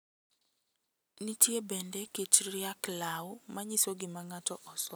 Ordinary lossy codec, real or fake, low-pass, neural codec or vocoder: none; real; none; none